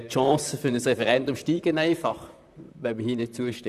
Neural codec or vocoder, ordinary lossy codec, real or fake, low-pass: vocoder, 44.1 kHz, 128 mel bands, Pupu-Vocoder; none; fake; 14.4 kHz